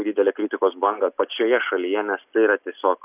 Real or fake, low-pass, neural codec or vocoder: real; 3.6 kHz; none